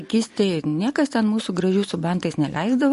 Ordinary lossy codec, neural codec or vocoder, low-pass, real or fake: MP3, 48 kbps; none; 14.4 kHz; real